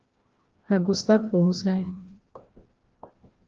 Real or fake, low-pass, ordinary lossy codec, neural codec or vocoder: fake; 7.2 kHz; Opus, 16 kbps; codec, 16 kHz, 1 kbps, FreqCodec, larger model